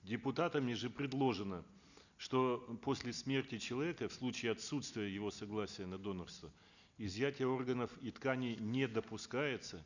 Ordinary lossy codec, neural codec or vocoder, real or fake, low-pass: none; none; real; 7.2 kHz